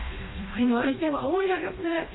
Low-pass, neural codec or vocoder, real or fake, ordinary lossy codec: 7.2 kHz; codec, 16 kHz, 1 kbps, FreqCodec, smaller model; fake; AAC, 16 kbps